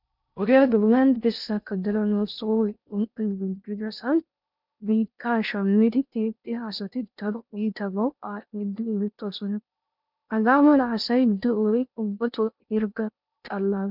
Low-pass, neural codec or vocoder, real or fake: 5.4 kHz; codec, 16 kHz in and 24 kHz out, 0.6 kbps, FocalCodec, streaming, 2048 codes; fake